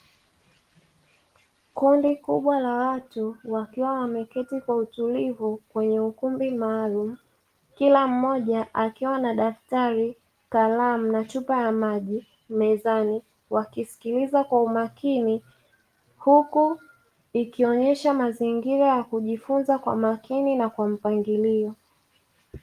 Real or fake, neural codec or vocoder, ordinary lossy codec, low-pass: real; none; Opus, 24 kbps; 14.4 kHz